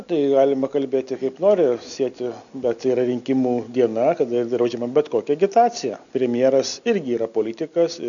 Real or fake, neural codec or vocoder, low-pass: real; none; 7.2 kHz